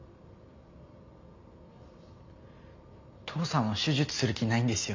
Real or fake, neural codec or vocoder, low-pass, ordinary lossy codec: real; none; 7.2 kHz; MP3, 48 kbps